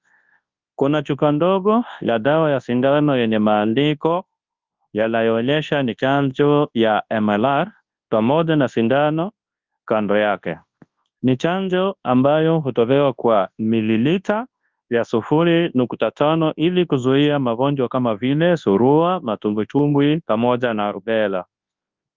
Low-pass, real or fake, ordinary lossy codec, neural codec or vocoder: 7.2 kHz; fake; Opus, 24 kbps; codec, 24 kHz, 0.9 kbps, WavTokenizer, large speech release